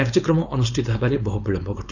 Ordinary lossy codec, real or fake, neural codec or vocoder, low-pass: none; fake; codec, 16 kHz, 4.8 kbps, FACodec; 7.2 kHz